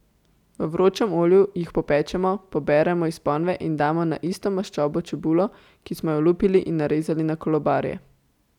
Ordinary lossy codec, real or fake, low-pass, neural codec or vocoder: none; real; 19.8 kHz; none